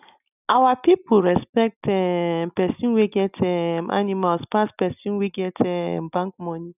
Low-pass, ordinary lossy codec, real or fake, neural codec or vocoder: 3.6 kHz; none; real; none